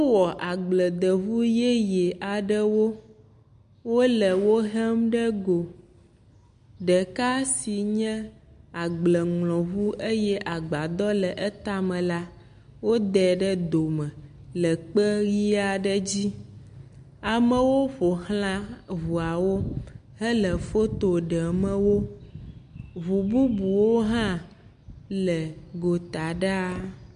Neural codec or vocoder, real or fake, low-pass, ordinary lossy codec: none; real; 9.9 kHz; MP3, 64 kbps